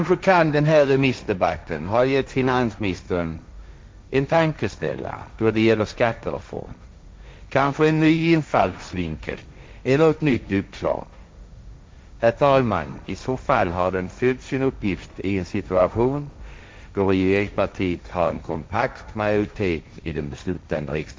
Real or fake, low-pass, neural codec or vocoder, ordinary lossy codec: fake; none; codec, 16 kHz, 1.1 kbps, Voila-Tokenizer; none